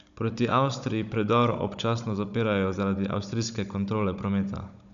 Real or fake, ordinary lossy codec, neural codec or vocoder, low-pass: fake; none; codec, 16 kHz, 16 kbps, FunCodec, trained on Chinese and English, 50 frames a second; 7.2 kHz